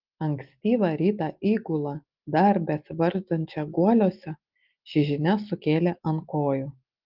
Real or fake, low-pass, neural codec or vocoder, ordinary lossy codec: real; 5.4 kHz; none; Opus, 32 kbps